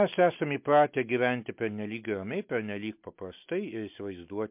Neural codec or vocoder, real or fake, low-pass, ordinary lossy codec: none; real; 3.6 kHz; MP3, 32 kbps